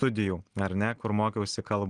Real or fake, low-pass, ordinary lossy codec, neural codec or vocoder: real; 9.9 kHz; Opus, 24 kbps; none